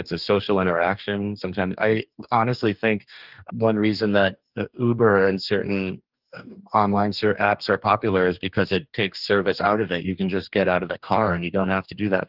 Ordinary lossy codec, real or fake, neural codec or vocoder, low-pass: Opus, 32 kbps; fake; codec, 44.1 kHz, 2.6 kbps, DAC; 5.4 kHz